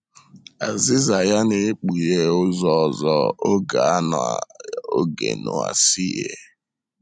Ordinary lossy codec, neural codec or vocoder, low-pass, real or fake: none; none; none; real